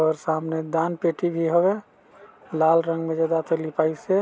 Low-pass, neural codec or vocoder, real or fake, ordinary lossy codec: none; none; real; none